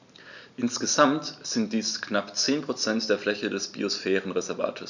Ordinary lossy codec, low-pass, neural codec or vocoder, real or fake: none; 7.2 kHz; none; real